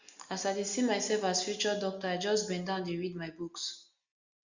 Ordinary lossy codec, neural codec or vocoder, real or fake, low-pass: Opus, 64 kbps; none; real; 7.2 kHz